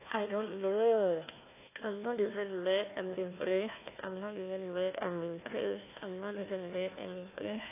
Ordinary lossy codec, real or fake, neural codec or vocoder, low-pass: AAC, 24 kbps; fake; codec, 16 kHz, 1 kbps, FunCodec, trained on Chinese and English, 50 frames a second; 3.6 kHz